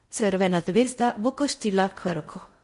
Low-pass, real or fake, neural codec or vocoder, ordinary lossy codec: 10.8 kHz; fake; codec, 16 kHz in and 24 kHz out, 0.6 kbps, FocalCodec, streaming, 4096 codes; MP3, 48 kbps